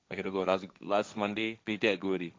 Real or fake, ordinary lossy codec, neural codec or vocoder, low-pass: fake; none; codec, 16 kHz, 1.1 kbps, Voila-Tokenizer; none